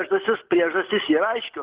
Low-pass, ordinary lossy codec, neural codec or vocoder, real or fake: 3.6 kHz; Opus, 16 kbps; none; real